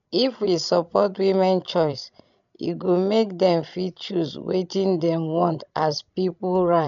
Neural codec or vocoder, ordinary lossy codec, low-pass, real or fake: codec, 16 kHz, 16 kbps, FreqCodec, larger model; none; 7.2 kHz; fake